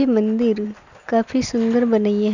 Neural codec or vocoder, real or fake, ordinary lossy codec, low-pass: none; real; Opus, 64 kbps; 7.2 kHz